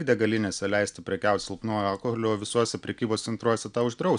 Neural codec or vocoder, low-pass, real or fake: none; 9.9 kHz; real